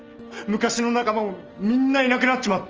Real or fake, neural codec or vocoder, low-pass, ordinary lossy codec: real; none; 7.2 kHz; Opus, 24 kbps